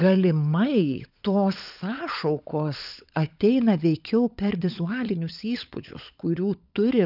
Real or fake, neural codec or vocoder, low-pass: fake; codec, 16 kHz, 16 kbps, FunCodec, trained on LibriTTS, 50 frames a second; 5.4 kHz